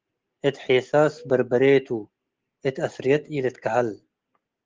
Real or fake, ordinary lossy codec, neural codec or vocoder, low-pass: real; Opus, 16 kbps; none; 7.2 kHz